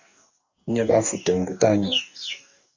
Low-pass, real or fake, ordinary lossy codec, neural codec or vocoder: 7.2 kHz; fake; Opus, 64 kbps; codec, 44.1 kHz, 2.6 kbps, DAC